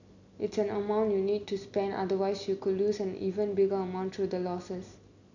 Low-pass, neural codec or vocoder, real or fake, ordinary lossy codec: 7.2 kHz; none; real; none